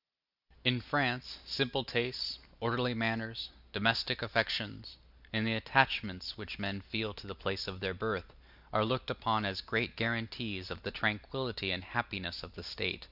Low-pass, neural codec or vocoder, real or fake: 5.4 kHz; none; real